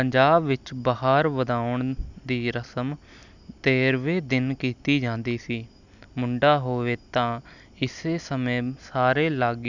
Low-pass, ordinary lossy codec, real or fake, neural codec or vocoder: 7.2 kHz; none; real; none